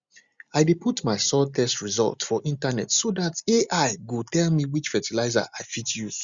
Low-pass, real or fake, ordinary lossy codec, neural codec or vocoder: 7.2 kHz; real; Opus, 64 kbps; none